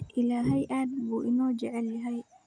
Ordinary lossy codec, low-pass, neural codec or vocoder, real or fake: none; 9.9 kHz; none; real